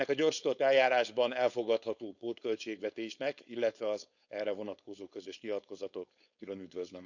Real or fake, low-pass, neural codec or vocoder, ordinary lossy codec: fake; 7.2 kHz; codec, 16 kHz, 4.8 kbps, FACodec; none